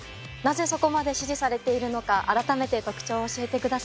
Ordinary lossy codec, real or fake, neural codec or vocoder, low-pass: none; real; none; none